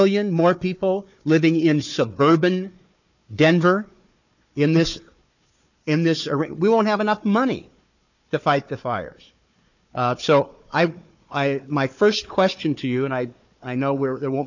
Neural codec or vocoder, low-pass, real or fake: codec, 16 kHz, 4 kbps, FunCodec, trained on Chinese and English, 50 frames a second; 7.2 kHz; fake